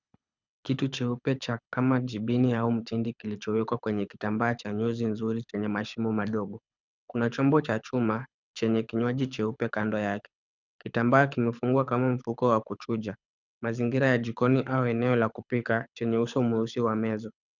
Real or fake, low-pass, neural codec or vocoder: fake; 7.2 kHz; codec, 24 kHz, 6 kbps, HILCodec